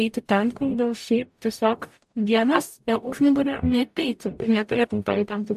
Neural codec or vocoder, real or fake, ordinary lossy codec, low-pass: codec, 44.1 kHz, 0.9 kbps, DAC; fake; AAC, 96 kbps; 14.4 kHz